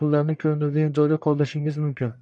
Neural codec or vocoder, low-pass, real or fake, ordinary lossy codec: codec, 44.1 kHz, 1.7 kbps, Pupu-Codec; 9.9 kHz; fake; MP3, 96 kbps